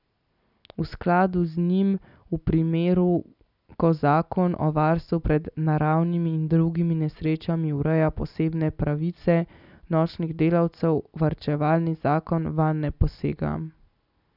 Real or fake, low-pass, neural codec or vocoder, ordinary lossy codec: real; 5.4 kHz; none; none